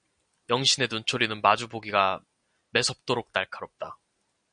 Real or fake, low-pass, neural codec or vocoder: real; 9.9 kHz; none